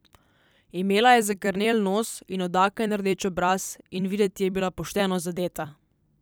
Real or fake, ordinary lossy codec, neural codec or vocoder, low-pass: fake; none; vocoder, 44.1 kHz, 128 mel bands every 256 samples, BigVGAN v2; none